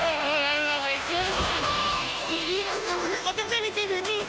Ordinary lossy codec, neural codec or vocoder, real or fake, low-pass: none; codec, 16 kHz, 0.5 kbps, FunCodec, trained on Chinese and English, 25 frames a second; fake; none